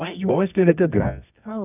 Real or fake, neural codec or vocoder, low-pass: fake; codec, 24 kHz, 0.9 kbps, WavTokenizer, medium music audio release; 3.6 kHz